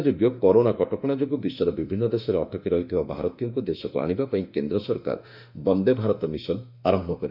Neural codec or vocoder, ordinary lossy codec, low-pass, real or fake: autoencoder, 48 kHz, 32 numbers a frame, DAC-VAE, trained on Japanese speech; none; 5.4 kHz; fake